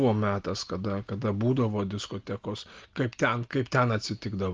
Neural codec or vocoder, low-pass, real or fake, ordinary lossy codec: none; 7.2 kHz; real; Opus, 24 kbps